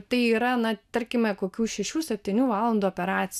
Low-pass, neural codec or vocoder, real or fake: 14.4 kHz; none; real